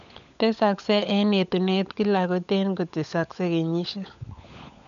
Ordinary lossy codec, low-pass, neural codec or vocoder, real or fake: none; 7.2 kHz; codec, 16 kHz, 16 kbps, FunCodec, trained on LibriTTS, 50 frames a second; fake